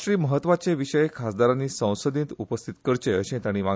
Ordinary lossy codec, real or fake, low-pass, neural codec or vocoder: none; real; none; none